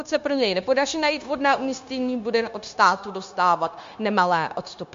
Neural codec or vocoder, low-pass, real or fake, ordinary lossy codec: codec, 16 kHz, 0.9 kbps, LongCat-Audio-Codec; 7.2 kHz; fake; MP3, 48 kbps